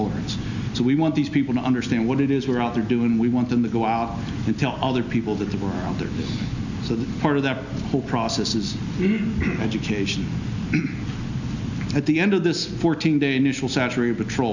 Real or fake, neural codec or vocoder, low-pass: real; none; 7.2 kHz